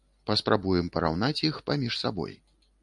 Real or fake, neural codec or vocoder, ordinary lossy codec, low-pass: real; none; MP3, 64 kbps; 10.8 kHz